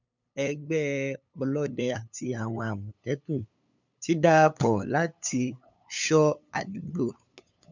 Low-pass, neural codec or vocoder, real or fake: 7.2 kHz; codec, 16 kHz, 8 kbps, FunCodec, trained on LibriTTS, 25 frames a second; fake